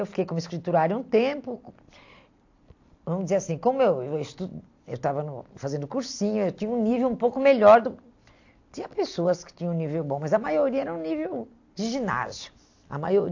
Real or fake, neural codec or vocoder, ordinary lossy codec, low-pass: real; none; AAC, 48 kbps; 7.2 kHz